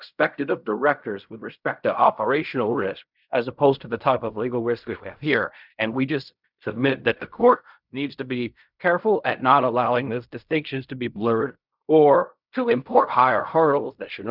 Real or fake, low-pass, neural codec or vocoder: fake; 5.4 kHz; codec, 16 kHz in and 24 kHz out, 0.4 kbps, LongCat-Audio-Codec, fine tuned four codebook decoder